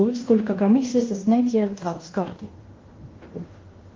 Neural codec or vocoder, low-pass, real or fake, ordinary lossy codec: codec, 16 kHz in and 24 kHz out, 0.9 kbps, LongCat-Audio-Codec, fine tuned four codebook decoder; 7.2 kHz; fake; Opus, 24 kbps